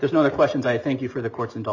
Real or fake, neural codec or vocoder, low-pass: real; none; 7.2 kHz